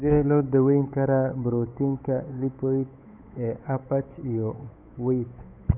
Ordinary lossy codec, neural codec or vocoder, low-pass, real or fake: none; codec, 16 kHz, 8 kbps, FunCodec, trained on Chinese and English, 25 frames a second; 3.6 kHz; fake